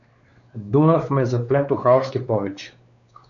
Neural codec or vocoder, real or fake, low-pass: codec, 16 kHz, 2 kbps, X-Codec, WavLM features, trained on Multilingual LibriSpeech; fake; 7.2 kHz